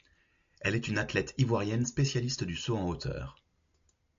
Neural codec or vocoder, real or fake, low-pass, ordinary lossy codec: none; real; 7.2 kHz; AAC, 64 kbps